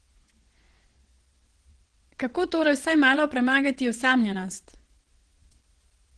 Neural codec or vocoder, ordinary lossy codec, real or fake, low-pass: vocoder, 22.05 kHz, 80 mel bands, WaveNeXt; Opus, 16 kbps; fake; 9.9 kHz